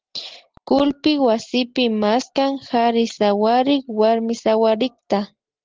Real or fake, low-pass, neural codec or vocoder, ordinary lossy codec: real; 7.2 kHz; none; Opus, 16 kbps